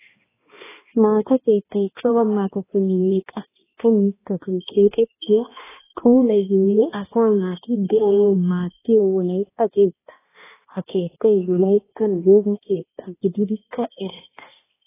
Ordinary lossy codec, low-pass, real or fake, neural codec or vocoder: AAC, 16 kbps; 3.6 kHz; fake; codec, 16 kHz, 1 kbps, X-Codec, HuBERT features, trained on balanced general audio